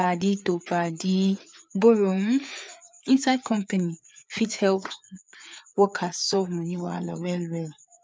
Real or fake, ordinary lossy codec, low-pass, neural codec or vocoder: fake; none; none; codec, 16 kHz, 4 kbps, FreqCodec, larger model